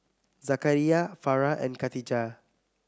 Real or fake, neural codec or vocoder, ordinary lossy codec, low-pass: real; none; none; none